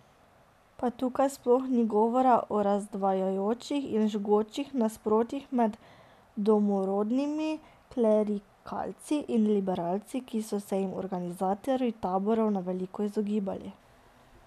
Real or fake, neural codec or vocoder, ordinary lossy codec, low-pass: real; none; none; 14.4 kHz